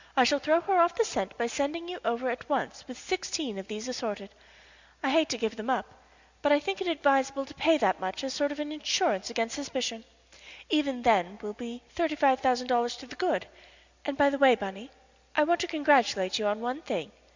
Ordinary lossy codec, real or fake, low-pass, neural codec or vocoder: Opus, 64 kbps; real; 7.2 kHz; none